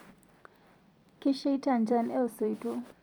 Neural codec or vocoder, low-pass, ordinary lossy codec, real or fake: vocoder, 44.1 kHz, 128 mel bands every 256 samples, BigVGAN v2; 19.8 kHz; none; fake